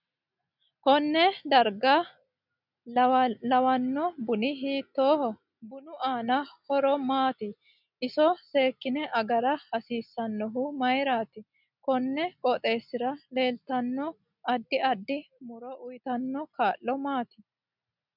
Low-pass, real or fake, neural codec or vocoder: 5.4 kHz; real; none